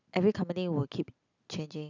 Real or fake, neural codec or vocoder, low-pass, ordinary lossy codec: real; none; 7.2 kHz; none